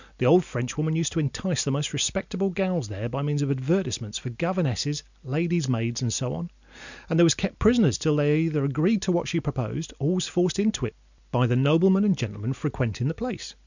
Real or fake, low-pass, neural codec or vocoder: real; 7.2 kHz; none